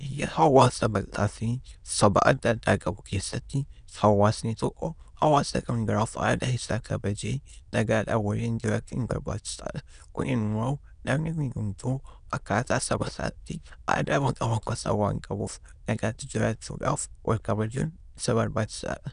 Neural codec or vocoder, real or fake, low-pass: autoencoder, 22.05 kHz, a latent of 192 numbers a frame, VITS, trained on many speakers; fake; 9.9 kHz